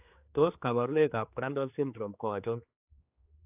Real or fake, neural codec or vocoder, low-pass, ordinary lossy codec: fake; codec, 16 kHz, 2 kbps, X-Codec, HuBERT features, trained on general audio; 3.6 kHz; AAC, 32 kbps